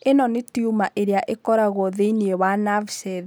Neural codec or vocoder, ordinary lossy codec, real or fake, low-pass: none; none; real; none